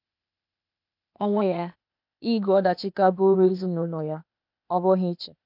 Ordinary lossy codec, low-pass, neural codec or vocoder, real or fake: none; 5.4 kHz; codec, 16 kHz, 0.8 kbps, ZipCodec; fake